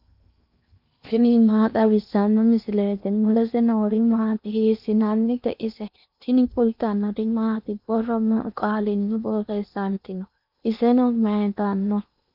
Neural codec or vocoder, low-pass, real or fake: codec, 16 kHz in and 24 kHz out, 0.8 kbps, FocalCodec, streaming, 65536 codes; 5.4 kHz; fake